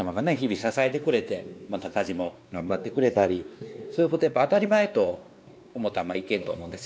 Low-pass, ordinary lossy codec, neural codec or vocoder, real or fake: none; none; codec, 16 kHz, 2 kbps, X-Codec, WavLM features, trained on Multilingual LibriSpeech; fake